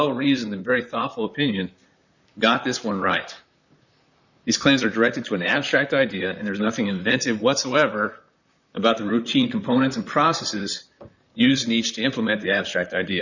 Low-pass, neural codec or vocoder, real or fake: 7.2 kHz; vocoder, 44.1 kHz, 128 mel bands, Pupu-Vocoder; fake